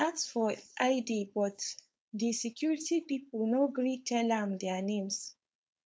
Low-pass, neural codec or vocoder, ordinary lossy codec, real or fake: none; codec, 16 kHz, 4.8 kbps, FACodec; none; fake